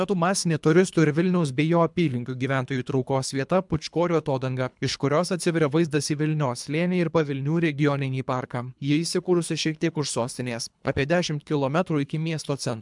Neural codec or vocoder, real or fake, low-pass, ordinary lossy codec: codec, 24 kHz, 3 kbps, HILCodec; fake; 10.8 kHz; MP3, 96 kbps